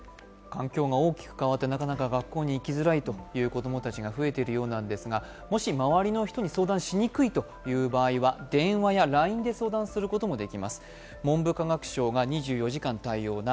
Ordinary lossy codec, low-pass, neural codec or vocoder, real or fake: none; none; none; real